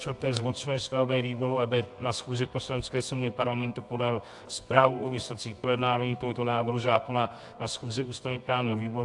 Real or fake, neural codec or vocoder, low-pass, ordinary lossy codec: fake; codec, 24 kHz, 0.9 kbps, WavTokenizer, medium music audio release; 10.8 kHz; AAC, 64 kbps